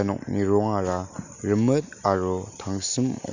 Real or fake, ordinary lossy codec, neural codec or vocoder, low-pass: real; none; none; 7.2 kHz